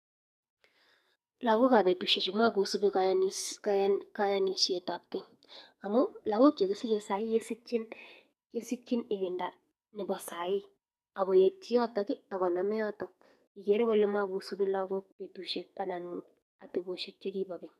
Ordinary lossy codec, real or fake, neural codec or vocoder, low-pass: none; fake; codec, 44.1 kHz, 2.6 kbps, SNAC; 14.4 kHz